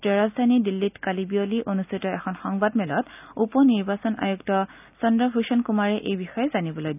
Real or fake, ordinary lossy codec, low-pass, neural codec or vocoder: real; none; 3.6 kHz; none